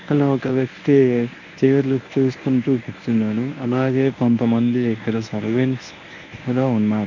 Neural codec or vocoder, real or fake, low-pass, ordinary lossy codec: codec, 24 kHz, 0.9 kbps, WavTokenizer, medium speech release version 1; fake; 7.2 kHz; none